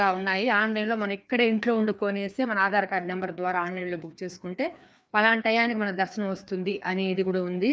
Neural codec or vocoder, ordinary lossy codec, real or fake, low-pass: codec, 16 kHz, 2 kbps, FreqCodec, larger model; none; fake; none